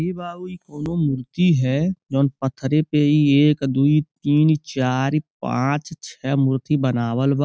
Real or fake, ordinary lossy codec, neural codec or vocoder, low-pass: real; none; none; none